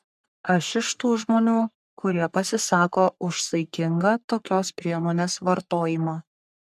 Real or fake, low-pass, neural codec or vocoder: fake; 14.4 kHz; codec, 44.1 kHz, 3.4 kbps, Pupu-Codec